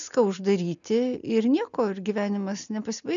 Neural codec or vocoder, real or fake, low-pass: none; real; 7.2 kHz